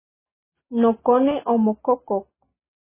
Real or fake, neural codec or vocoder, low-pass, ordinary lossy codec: real; none; 3.6 kHz; MP3, 16 kbps